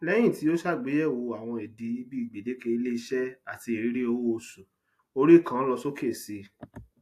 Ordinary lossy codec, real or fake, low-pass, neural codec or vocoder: AAC, 64 kbps; real; 14.4 kHz; none